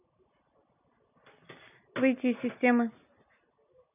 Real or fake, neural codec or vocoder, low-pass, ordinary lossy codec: real; none; 3.6 kHz; none